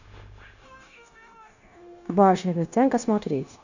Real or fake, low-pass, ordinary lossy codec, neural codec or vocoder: fake; 7.2 kHz; none; codec, 16 kHz, 0.9 kbps, LongCat-Audio-Codec